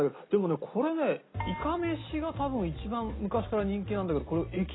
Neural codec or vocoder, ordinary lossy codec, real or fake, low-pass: none; AAC, 16 kbps; real; 7.2 kHz